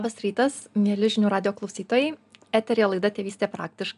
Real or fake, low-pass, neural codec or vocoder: real; 10.8 kHz; none